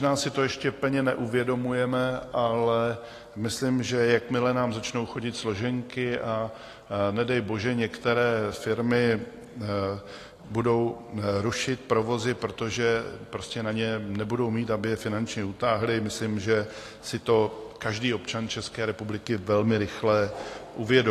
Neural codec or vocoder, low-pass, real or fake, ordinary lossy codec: none; 14.4 kHz; real; AAC, 48 kbps